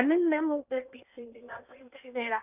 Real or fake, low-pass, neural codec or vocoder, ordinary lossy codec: fake; 3.6 kHz; codec, 16 kHz in and 24 kHz out, 0.8 kbps, FocalCodec, streaming, 65536 codes; none